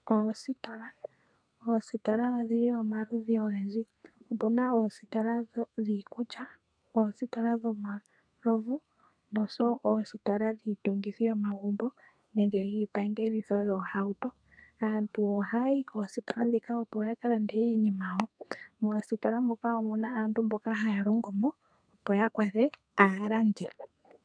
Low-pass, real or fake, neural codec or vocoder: 9.9 kHz; fake; codec, 32 kHz, 1.9 kbps, SNAC